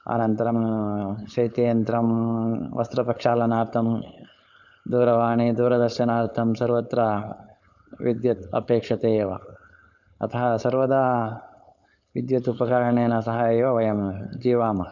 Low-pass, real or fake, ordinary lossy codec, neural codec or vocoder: 7.2 kHz; fake; none; codec, 16 kHz, 4.8 kbps, FACodec